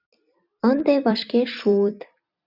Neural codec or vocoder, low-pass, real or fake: vocoder, 44.1 kHz, 128 mel bands every 256 samples, BigVGAN v2; 5.4 kHz; fake